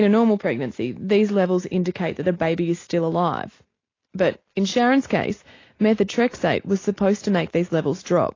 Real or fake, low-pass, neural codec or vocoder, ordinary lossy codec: real; 7.2 kHz; none; AAC, 32 kbps